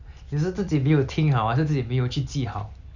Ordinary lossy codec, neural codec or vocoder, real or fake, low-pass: none; none; real; 7.2 kHz